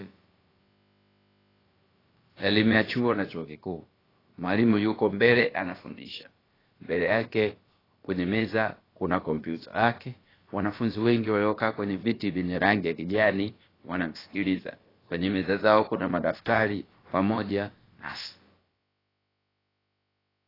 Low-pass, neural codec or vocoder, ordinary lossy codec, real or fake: 5.4 kHz; codec, 16 kHz, about 1 kbps, DyCAST, with the encoder's durations; AAC, 24 kbps; fake